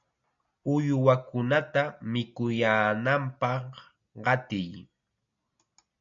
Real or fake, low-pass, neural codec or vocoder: real; 7.2 kHz; none